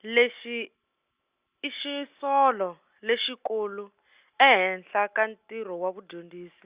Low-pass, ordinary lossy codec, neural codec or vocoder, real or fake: 3.6 kHz; Opus, 64 kbps; none; real